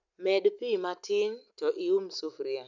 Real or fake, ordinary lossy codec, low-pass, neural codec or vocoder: real; none; 7.2 kHz; none